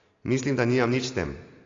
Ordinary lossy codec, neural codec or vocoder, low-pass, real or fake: AAC, 32 kbps; none; 7.2 kHz; real